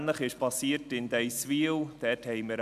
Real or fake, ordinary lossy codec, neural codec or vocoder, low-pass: real; none; none; 14.4 kHz